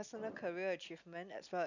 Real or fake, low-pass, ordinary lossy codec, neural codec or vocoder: real; 7.2 kHz; none; none